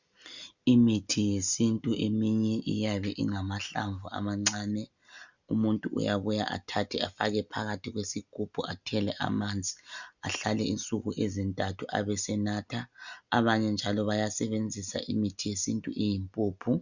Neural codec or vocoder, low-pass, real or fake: none; 7.2 kHz; real